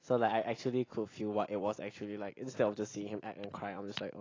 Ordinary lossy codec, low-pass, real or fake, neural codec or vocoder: AAC, 32 kbps; 7.2 kHz; real; none